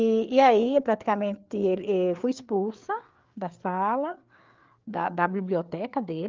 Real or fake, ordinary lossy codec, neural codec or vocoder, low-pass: fake; Opus, 32 kbps; codec, 16 kHz, 4 kbps, FreqCodec, larger model; 7.2 kHz